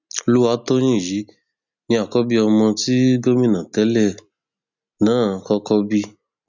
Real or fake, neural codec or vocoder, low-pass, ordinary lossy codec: real; none; 7.2 kHz; none